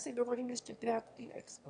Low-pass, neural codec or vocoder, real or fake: 9.9 kHz; autoencoder, 22.05 kHz, a latent of 192 numbers a frame, VITS, trained on one speaker; fake